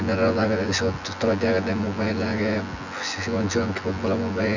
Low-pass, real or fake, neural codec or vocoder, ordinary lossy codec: 7.2 kHz; fake; vocoder, 24 kHz, 100 mel bands, Vocos; none